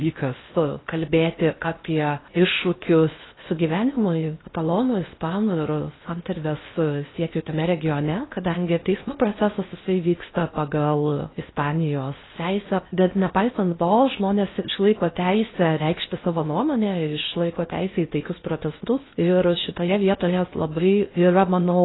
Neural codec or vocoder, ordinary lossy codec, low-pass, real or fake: codec, 16 kHz in and 24 kHz out, 0.6 kbps, FocalCodec, streaming, 4096 codes; AAC, 16 kbps; 7.2 kHz; fake